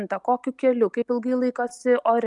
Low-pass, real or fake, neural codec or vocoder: 10.8 kHz; real; none